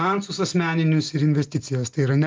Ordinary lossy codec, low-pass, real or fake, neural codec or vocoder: Opus, 32 kbps; 7.2 kHz; real; none